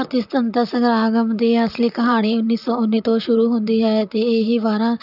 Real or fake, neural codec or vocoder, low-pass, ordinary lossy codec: fake; vocoder, 44.1 kHz, 128 mel bands, Pupu-Vocoder; 5.4 kHz; none